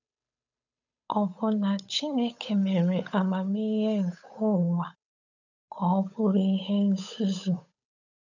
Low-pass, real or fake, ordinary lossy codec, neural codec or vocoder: 7.2 kHz; fake; none; codec, 16 kHz, 8 kbps, FunCodec, trained on Chinese and English, 25 frames a second